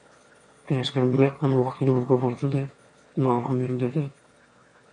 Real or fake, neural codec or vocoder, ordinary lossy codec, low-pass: fake; autoencoder, 22.05 kHz, a latent of 192 numbers a frame, VITS, trained on one speaker; MP3, 48 kbps; 9.9 kHz